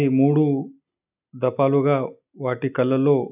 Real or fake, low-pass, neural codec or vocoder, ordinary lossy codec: real; 3.6 kHz; none; none